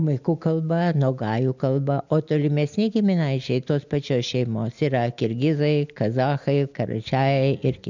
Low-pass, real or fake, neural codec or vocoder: 7.2 kHz; real; none